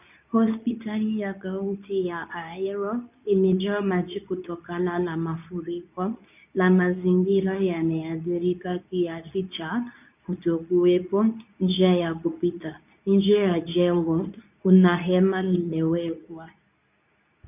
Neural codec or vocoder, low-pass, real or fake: codec, 24 kHz, 0.9 kbps, WavTokenizer, medium speech release version 2; 3.6 kHz; fake